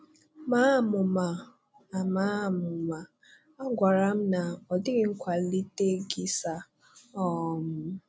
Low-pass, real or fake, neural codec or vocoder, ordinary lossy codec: none; real; none; none